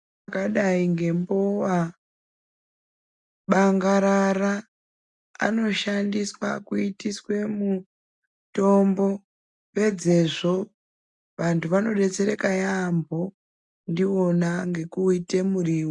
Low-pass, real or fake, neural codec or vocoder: 10.8 kHz; real; none